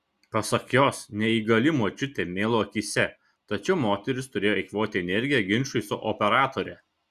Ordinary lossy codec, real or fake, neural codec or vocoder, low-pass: Opus, 64 kbps; real; none; 14.4 kHz